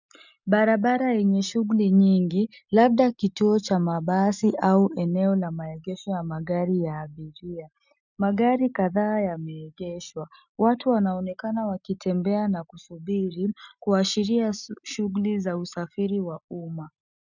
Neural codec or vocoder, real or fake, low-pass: none; real; 7.2 kHz